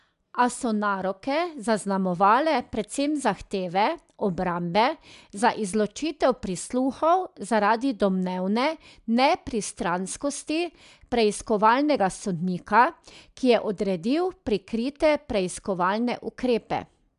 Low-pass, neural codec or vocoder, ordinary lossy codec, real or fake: 10.8 kHz; none; MP3, 96 kbps; real